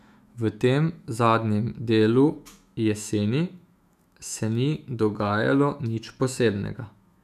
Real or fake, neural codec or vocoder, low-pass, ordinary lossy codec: fake; autoencoder, 48 kHz, 128 numbers a frame, DAC-VAE, trained on Japanese speech; 14.4 kHz; none